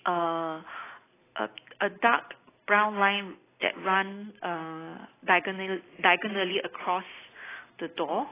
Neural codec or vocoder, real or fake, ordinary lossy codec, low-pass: none; real; AAC, 16 kbps; 3.6 kHz